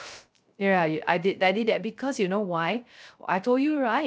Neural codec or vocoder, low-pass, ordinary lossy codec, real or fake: codec, 16 kHz, 0.3 kbps, FocalCodec; none; none; fake